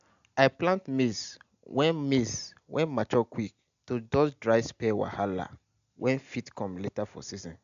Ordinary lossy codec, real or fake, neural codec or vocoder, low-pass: AAC, 96 kbps; real; none; 7.2 kHz